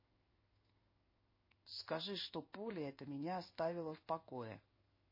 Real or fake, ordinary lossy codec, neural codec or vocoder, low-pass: fake; MP3, 24 kbps; codec, 16 kHz in and 24 kHz out, 1 kbps, XY-Tokenizer; 5.4 kHz